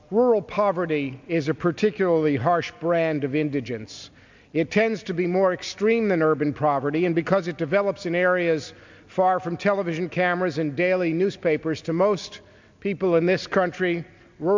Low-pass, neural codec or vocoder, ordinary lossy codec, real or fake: 7.2 kHz; none; MP3, 64 kbps; real